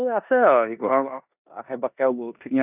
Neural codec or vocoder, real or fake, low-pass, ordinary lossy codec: codec, 16 kHz in and 24 kHz out, 0.9 kbps, LongCat-Audio-Codec, four codebook decoder; fake; 3.6 kHz; none